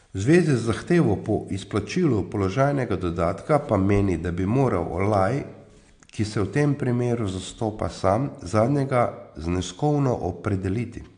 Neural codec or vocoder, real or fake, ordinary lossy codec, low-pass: none; real; AAC, 64 kbps; 9.9 kHz